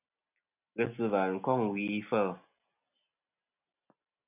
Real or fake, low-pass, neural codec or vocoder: real; 3.6 kHz; none